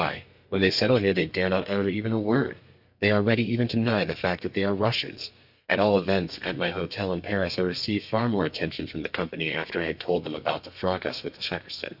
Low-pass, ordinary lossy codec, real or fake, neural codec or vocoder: 5.4 kHz; AAC, 48 kbps; fake; codec, 32 kHz, 1.9 kbps, SNAC